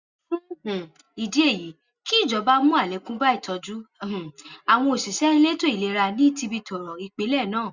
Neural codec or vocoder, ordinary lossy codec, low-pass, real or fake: none; Opus, 64 kbps; 7.2 kHz; real